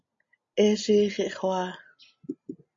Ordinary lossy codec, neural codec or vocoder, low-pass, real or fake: MP3, 48 kbps; none; 7.2 kHz; real